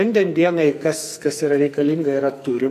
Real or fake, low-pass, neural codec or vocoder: fake; 14.4 kHz; codec, 44.1 kHz, 2.6 kbps, SNAC